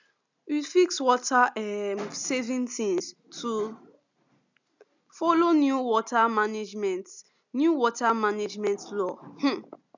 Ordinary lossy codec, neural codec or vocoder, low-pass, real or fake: none; none; 7.2 kHz; real